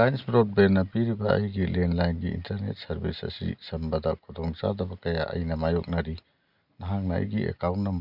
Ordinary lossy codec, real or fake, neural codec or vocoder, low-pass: Opus, 64 kbps; real; none; 5.4 kHz